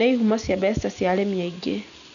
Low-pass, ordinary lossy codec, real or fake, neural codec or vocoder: 7.2 kHz; none; real; none